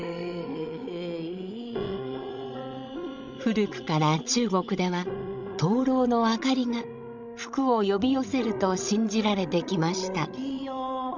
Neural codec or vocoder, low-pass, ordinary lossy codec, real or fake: codec, 16 kHz, 16 kbps, FreqCodec, larger model; 7.2 kHz; none; fake